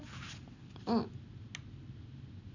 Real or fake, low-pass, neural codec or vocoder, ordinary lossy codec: real; 7.2 kHz; none; none